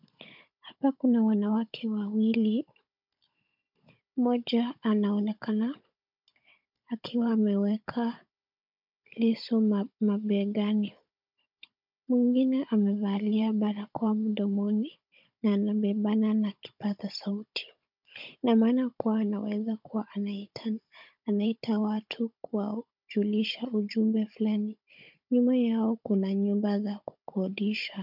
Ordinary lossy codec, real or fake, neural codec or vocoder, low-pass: MP3, 48 kbps; fake; codec, 16 kHz, 16 kbps, FunCodec, trained on Chinese and English, 50 frames a second; 5.4 kHz